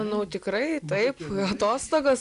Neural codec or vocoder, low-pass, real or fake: none; 10.8 kHz; real